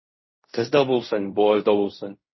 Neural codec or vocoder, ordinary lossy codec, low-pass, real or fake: codec, 16 kHz in and 24 kHz out, 0.4 kbps, LongCat-Audio-Codec, fine tuned four codebook decoder; MP3, 24 kbps; 7.2 kHz; fake